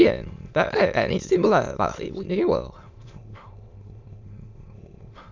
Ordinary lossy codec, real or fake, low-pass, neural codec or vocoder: MP3, 64 kbps; fake; 7.2 kHz; autoencoder, 22.05 kHz, a latent of 192 numbers a frame, VITS, trained on many speakers